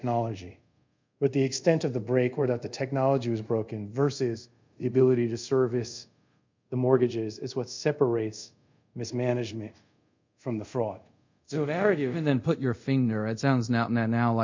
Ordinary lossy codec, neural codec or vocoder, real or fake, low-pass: MP3, 64 kbps; codec, 24 kHz, 0.5 kbps, DualCodec; fake; 7.2 kHz